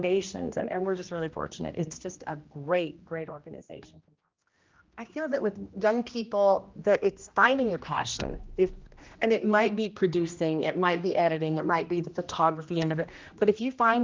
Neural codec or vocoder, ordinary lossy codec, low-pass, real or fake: codec, 16 kHz, 1 kbps, X-Codec, HuBERT features, trained on general audio; Opus, 32 kbps; 7.2 kHz; fake